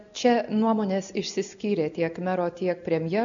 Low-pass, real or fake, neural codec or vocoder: 7.2 kHz; real; none